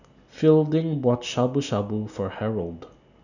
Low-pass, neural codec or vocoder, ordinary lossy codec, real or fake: 7.2 kHz; none; none; real